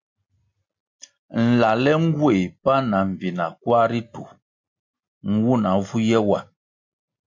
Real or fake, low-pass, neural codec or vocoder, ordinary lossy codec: real; 7.2 kHz; none; MP3, 48 kbps